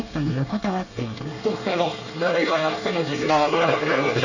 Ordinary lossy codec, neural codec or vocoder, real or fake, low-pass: none; codec, 24 kHz, 1 kbps, SNAC; fake; 7.2 kHz